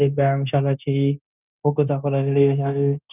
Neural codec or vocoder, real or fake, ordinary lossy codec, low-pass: codec, 16 kHz, 0.9 kbps, LongCat-Audio-Codec; fake; none; 3.6 kHz